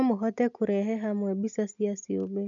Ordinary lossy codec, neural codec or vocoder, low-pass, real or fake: none; none; 7.2 kHz; real